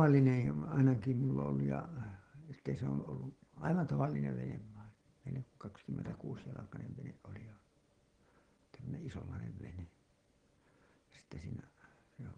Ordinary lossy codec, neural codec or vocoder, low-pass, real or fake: Opus, 16 kbps; none; 9.9 kHz; real